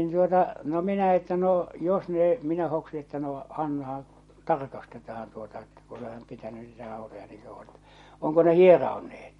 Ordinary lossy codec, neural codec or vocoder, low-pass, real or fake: MP3, 48 kbps; none; 19.8 kHz; real